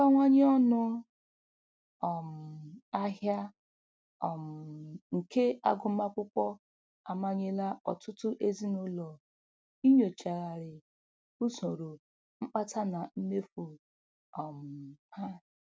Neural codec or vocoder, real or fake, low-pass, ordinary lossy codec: none; real; none; none